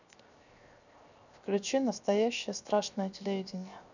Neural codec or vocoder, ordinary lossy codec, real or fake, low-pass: codec, 16 kHz, 0.7 kbps, FocalCodec; none; fake; 7.2 kHz